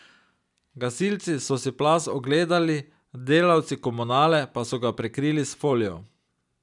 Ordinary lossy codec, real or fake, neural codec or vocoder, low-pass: none; real; none; 10.8 kHz